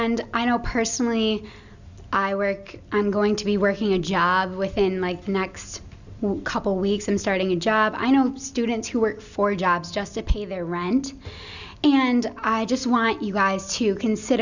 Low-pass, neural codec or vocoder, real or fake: 7.2 kHz; none; real